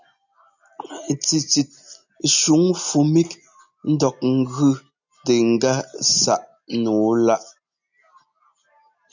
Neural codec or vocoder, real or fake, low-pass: none; real; 7.2 kHz